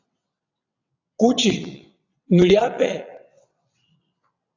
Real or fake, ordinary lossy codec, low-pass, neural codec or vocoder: fake; AAC, 48 kbps; 7.2 kHz; vocoder, 22.05 kHz, 80 mel bands, WaveNeXt